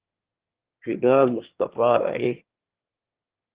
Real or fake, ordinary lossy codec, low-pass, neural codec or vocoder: fake; Opus, 16 kbps; 3.6 kHz; autoencoder, 22.05 kHz, a latent of 192 numbers a frame, VITS, trained on one speaker